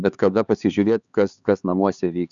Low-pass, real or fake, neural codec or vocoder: 7.2 kHz; fake; codec, 16 kHz, 4 kbps, X-Codec, HuBERT features, trained on LibriSpeech